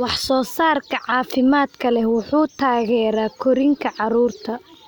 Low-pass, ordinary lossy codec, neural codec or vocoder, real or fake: none; none; none; real